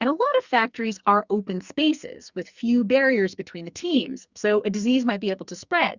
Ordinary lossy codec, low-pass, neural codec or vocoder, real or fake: Opus, 64 kbps; 7.2 kHz; codec, 44.1 kHz, 2.6 kbps, SNAC; fake